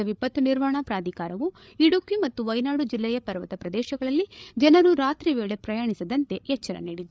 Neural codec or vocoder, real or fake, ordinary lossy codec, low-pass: codec, 16 kHz, 8 kbps, FreqCodec, larger model; fake; none; none